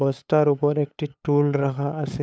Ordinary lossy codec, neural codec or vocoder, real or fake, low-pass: none; codec, 16 kHz, 8 kbps, FunCodec, trained on LibriTTS, 25 frames a second; fake; none